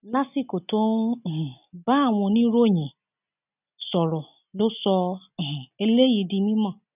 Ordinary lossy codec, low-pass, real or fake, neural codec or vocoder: none; 3.6 kHz; real; none